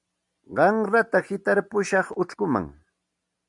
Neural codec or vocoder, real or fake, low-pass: none; real; 10.8 kHz